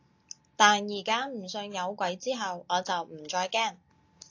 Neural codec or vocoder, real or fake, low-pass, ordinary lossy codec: none; real; 7.2 kHz; AAC, 48 kbps